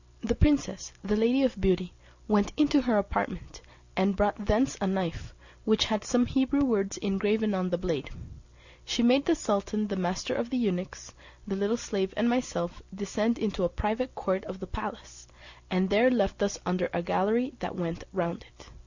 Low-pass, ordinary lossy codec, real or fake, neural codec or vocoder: 7.2 kHz; Opus, 64 kbps; real; none